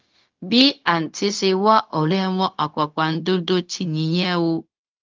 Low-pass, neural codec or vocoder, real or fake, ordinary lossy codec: 7.2 kHz; codec, 16 kHz, 0.4 kbps, LongCat-Audio-Codec; fake; Opus, 24 kbps